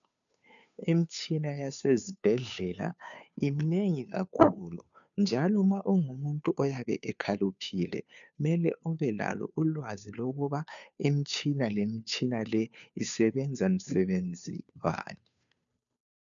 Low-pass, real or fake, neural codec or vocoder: 7.2 kHz; fake; codec, 16 kHz, 2 kbps, FunCodec, trained on Chinese and English, 25 frames a second